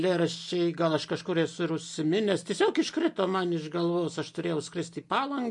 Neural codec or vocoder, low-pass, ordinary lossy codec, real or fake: none; 10.8 kHz; MP3, 48 kbps; real